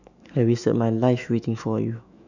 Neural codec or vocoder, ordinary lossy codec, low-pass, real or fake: autoencoder, 48 kHz, 128 numbers a frame, DAC-VAE, trained on Japanese speech; none; 7.2 kHz; fake